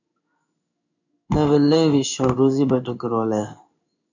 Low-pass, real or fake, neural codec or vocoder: 7.2 kHz; fake; codec, 16 kHz in and 24 kHz out, 1 kbps, XY-Tokenizer